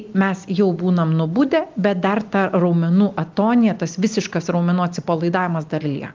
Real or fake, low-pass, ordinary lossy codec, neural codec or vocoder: real; 7.2 kHz; Opus, 32 kbps; none